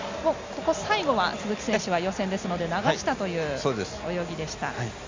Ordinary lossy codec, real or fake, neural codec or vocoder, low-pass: MP3, 64 kbps; real; none; 7.2 kHz